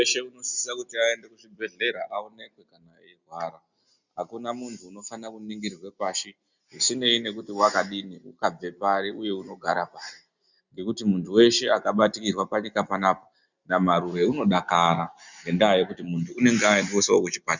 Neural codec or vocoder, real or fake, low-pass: none; real; 7.2 kHz